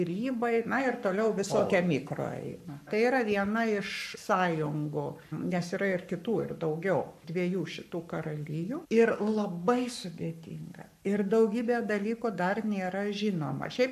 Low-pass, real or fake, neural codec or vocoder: 14.4 kHz; fake; codec, 44.1 kHz, 7.8 kbps, Pupu-Codec